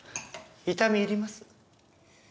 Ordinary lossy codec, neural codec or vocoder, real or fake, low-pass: none; none; real; none